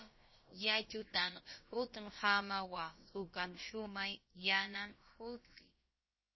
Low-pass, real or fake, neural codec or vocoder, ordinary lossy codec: 7.2 kHz; fake; codec, 16 kHz, about 1 kbps, DyCAST, with the encoder's durations; MP3, 24 kbps